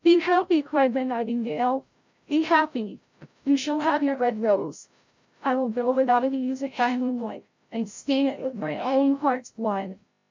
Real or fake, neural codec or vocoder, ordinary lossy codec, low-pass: fake; codec, 16 kHz, 0.5 kbps, FreqCodec, larger model; MP3, 64 kbps; 7.2 kHz